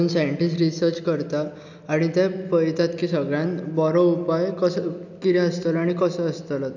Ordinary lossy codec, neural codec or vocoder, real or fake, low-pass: none; none; real; 7.2 kHz